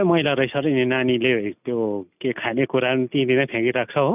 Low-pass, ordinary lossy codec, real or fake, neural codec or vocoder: 3.6 kHz; none; real; none